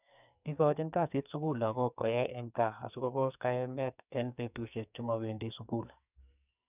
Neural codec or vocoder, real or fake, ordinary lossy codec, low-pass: codec, 44.1 kHz, 2.6 kbps, SNAC; fake; none; 3.6 kHz